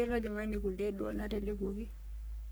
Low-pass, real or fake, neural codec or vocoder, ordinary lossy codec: none; fake; codec, 44.1 kHz, 3.4 kbps, Pupu-Codec; none